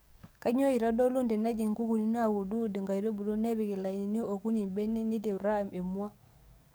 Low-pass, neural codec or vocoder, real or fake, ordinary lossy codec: none; codec, 44.1 kHz, 7.8 kbps, DAC; fake; none